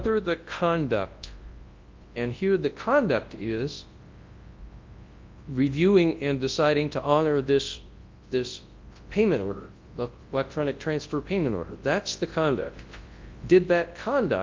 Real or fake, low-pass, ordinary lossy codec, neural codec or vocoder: fake; 7.2 kHz; Opus, 32 kbps; codec, 24 kHz, 0.9 kbps, WavTokenizer, large speech release